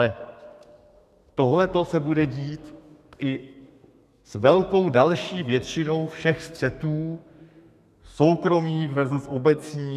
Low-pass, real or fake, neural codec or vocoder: 14.4 kHz; fake; codec, 32 kHz, 1.9 kbps, SNAC